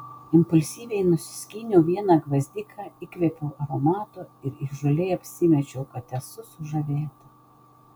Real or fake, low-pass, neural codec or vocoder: real; 19.8 kHz; none